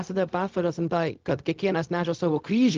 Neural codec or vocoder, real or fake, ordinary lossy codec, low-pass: codec, 16 kHz, 0.4 kbps, LongCat-Audio-Codec; fake; Opus, 24 kbps; 7.2 kHz